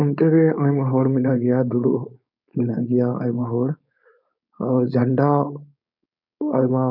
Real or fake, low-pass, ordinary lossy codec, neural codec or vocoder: fake; 5.4 kHz; none; codec, 16 kHz, 4.8 kbps, FACodec